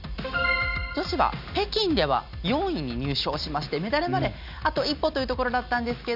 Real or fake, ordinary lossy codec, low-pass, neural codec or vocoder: real; none; 5.4 kHz; none